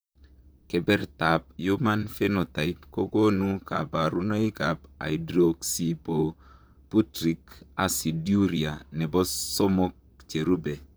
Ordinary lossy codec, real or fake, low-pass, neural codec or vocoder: none; fake; none; vocoder, 44.1 kHz, 128 mel bands, Pupu-Vocoder